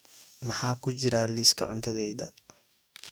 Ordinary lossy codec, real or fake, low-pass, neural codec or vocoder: none; fake; none; codec, 44.1 kHz, 2.6 kbps, DAC